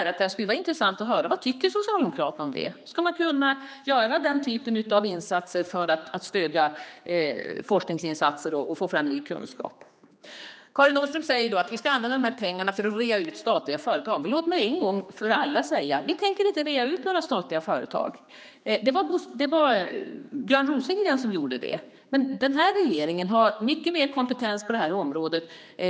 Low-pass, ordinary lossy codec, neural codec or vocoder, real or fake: none; none; codec, 16 kHz, 2 kbps, X-Codec, HuBERT features, trained on general audio; fake